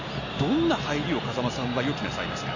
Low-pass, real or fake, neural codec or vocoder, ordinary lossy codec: 7.2 kHz; real; none; none